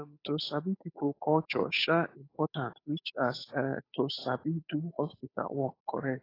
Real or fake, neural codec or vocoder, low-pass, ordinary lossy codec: fake; codec, 16 kHz, 8 kbps, FunCodec, trained on Chinese and English, 25 frames a second; 5.4 kHz; AAC, 24 kbps